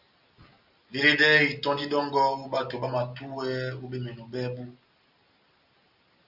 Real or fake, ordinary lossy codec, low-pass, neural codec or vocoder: real; Opus, 64 kbps; 5.4 kHz; none